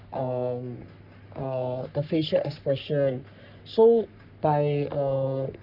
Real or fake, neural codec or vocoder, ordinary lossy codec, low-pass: fake; codec, 44.1 kHz, 3.4 kbps, Pupu-Codec; none; 5.4 kHz